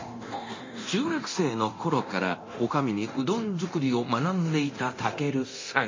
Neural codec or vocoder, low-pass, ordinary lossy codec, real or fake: codec, 24 kHz, 0.9 kbps, DualCodec; 7.2 kHz; MP3, 32 kbps; fake